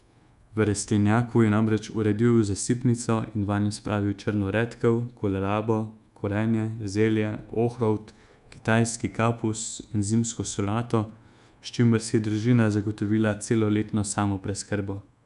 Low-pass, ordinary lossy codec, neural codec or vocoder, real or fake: 10.8 kHz; none; codec, 24 kHz, 1.2 kbps, DualCodec; fake